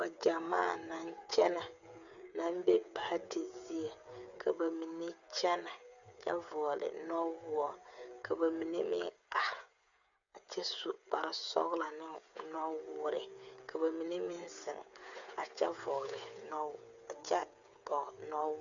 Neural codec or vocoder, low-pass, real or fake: codec, 16 kHz, 8 kbps, FreqCodec, smaller model; 7.2 kHz; fake